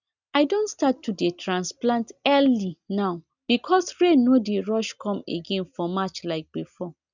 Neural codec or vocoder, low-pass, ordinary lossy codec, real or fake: none; 7.2 kHz; none; real